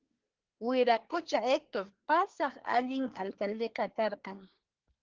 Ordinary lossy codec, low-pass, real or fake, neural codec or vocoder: Opus, 16 kbps; 7.2 kHz; fake; codec, 24 kHz, 1 kbps, SNAC